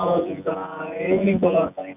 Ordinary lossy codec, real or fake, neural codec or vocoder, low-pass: none; fake; vocoder, 24 kHz, 100 mel bands, Vocos; 3.6 kHz